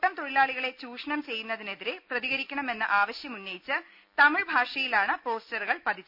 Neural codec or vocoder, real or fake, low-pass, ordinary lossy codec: none; real; 5.4 kHz; none